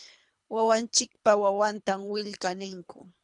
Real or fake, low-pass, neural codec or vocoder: fake; 10.8 kHz; codec, 24 kHz, 3 kbps, HILCodec